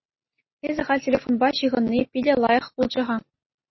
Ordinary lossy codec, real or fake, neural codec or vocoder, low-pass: MP3, 24 kbps; real; none; 7.2 kHz